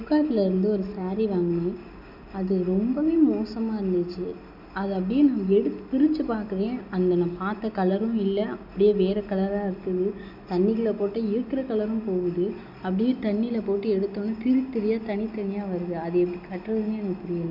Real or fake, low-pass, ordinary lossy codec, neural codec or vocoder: real; 5.4 kHz; none; none